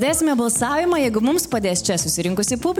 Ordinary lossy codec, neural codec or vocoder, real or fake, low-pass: MP3, 96 kbps; none; real; 19.8 kHz